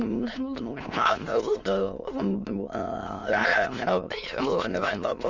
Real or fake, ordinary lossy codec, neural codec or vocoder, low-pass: fake; Opus, 32 kbps; autoencoder, 22.05 kHz, a latent of 192 numbers a frame, VITS, trained on many speakers; 7.2 kHz